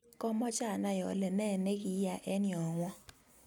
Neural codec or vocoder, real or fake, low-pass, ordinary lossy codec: none; real; none; none